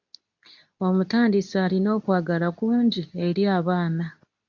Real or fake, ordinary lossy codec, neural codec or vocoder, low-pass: fake; MP3, 64 kbps; codec, 24 kHz, 0.9 kbps, WavTokenizer, medium speech release version 2; 7.2 kHz